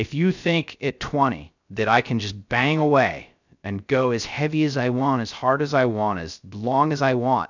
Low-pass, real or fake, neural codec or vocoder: 7.2 kHz; fake; codec, 16 kHz, 0.3 kbps, FocalCodec